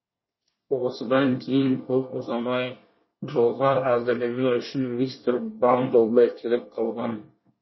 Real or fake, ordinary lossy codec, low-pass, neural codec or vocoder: fake; MP3, 24 kbps; 7.2 kHz; codec, 24 kHz, 1 kbps, SNAC